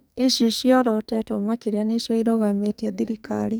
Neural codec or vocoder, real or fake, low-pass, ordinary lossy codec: codec, 44.1 kHz, 2.6 kbps, SNAC; fake; none; none